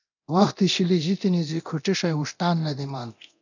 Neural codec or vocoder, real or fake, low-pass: codec, 24 kHz, 0.9 kbps, DualCodec; fake; 7.2 kHz